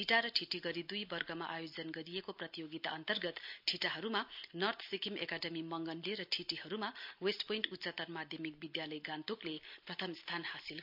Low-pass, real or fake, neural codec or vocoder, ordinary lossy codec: 5.4 kHz; real; none; MP3, 48 kbps